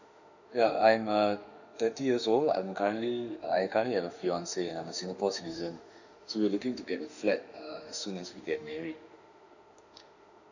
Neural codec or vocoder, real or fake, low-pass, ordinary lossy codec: autoencoder, 48 kHz, 32 numbers a frame, DAC-VAE, trained on Japanese speech; fake; 7.2 kHz; none